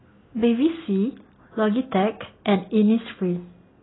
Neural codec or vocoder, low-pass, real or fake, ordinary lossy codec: none; 7.2 kHz; real; AAC, 16 kbps